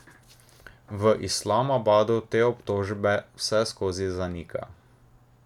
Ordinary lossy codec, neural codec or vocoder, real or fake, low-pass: none; none; real; 19.8 kHz